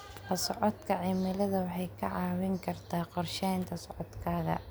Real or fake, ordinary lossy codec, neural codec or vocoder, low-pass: real; none; none; none